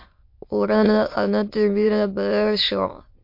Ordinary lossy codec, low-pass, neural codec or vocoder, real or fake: MP3, 32 kbps; 5.4 kHz; autoencoder, 22.05 kHz, a latent of 192 numbers a frame, VITS, trained on many speakers; fake